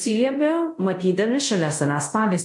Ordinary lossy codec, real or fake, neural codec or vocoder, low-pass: MP3, 48 kbps; fake; codec, 24 kHz, 0.5 kbps, DualCodec; 10.8 kHz